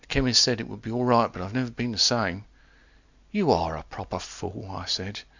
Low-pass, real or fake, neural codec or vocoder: 7.2 kHz; real; none